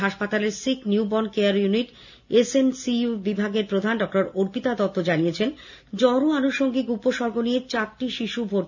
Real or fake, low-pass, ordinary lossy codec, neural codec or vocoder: real; 7.2 kHz; none; none